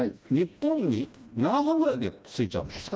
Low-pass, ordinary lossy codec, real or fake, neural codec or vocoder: none; none; fake; codec, 16 kHz, 1 kbps, FreqCodec, smaller model